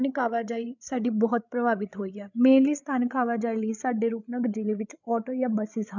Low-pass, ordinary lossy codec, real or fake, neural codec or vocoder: 7.2 kHz; none; fake; codec, 16 kHz, 8 kbps, FreqCodec, larger model